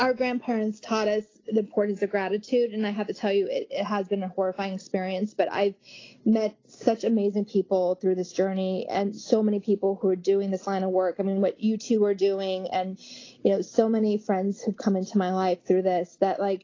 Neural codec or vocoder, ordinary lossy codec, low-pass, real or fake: none; AAC, 32 kbps; 7.2 kHz; real